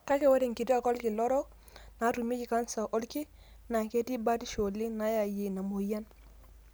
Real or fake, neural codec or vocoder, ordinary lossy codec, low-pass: real; none; none; none